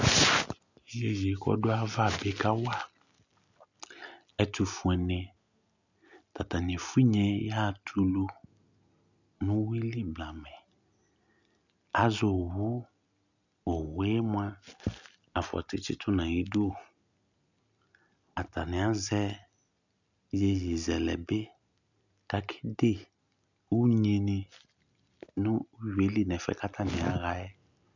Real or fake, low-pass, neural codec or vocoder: real; 7.2 kHz; none